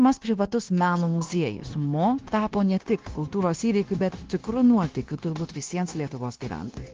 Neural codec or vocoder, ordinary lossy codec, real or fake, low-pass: codec, 16 kHz, 0.9 kbps, LongCat-Audio-Codec; Opus, 16 kbps; fake; 7.2 kHz